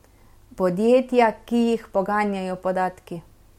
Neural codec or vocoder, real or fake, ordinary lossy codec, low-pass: none; real; MP3, 64 kbps; 19.8 kHz